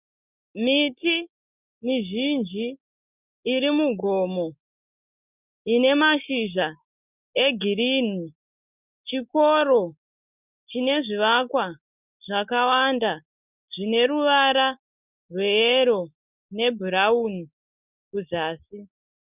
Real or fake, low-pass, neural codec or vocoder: real; 3.6 kHz; none